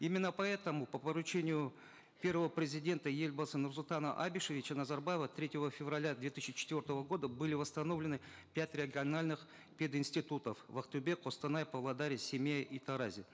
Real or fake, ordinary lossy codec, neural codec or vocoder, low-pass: real; none; none; none